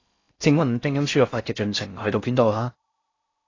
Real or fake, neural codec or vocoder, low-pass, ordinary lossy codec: fake; codec, 16 kHz in and 24 kHz out, 0.6 kbps, FocalCodec, streaming, 4096 codes; 7.2 kHz; AAC, 32 kbps